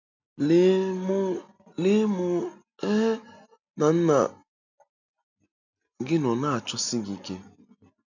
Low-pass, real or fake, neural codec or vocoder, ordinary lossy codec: 7.2 kHz; real; none; none